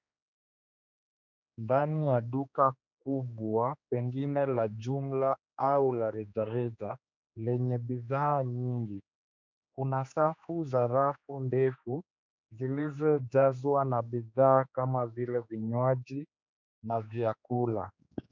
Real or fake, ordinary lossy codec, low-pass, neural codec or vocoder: fake; MP3, 64 kbps; 7.2 kHz; codec, 16 kHz, 2 kbps, X-Codec, HuBERT features, trained on general audio